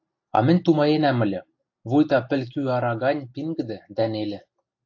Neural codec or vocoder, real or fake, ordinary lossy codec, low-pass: none; real; AAC, 48 kbps; 7.2 kHz